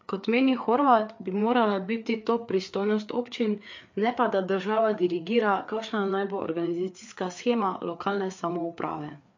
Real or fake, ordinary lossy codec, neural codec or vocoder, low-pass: fake; MP3, 48 kbps; codec, 16 kHz, 4 kbps, FreqCodec, larger model; 7.2 kHz